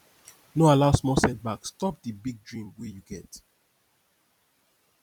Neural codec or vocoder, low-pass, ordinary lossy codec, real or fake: vocoder, 44.1 kHz, 128 mel bands every 256 samples, BigVGAN v2; 19.8 kHz; none; fake